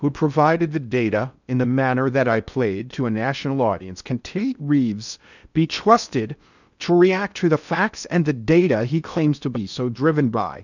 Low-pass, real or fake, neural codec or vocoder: 7.2 kHz; fake; codec, 16 kHz in and 24 kHz out, 0.6 kbps, FocalCodec, streaming, 2048 codes